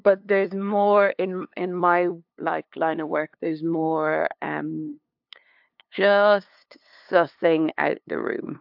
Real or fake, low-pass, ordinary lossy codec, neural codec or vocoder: fake; 5.4 kHz; AAC, 48 kbps; codec, 16 kHz, 2 kbps, FunCodec, trained on LibriTTS, 25 frames a second